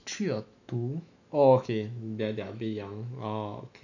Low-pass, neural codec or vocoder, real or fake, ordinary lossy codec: 7.2 kHz; autoencoder, 48 kHz, 128 numbers a frame, DAC-VAE, trained on Japanese speech; fake; none